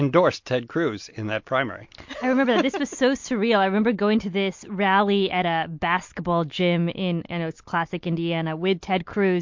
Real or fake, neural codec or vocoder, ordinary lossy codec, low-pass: real; none; MP3, 48 kbps; 7.2 kHz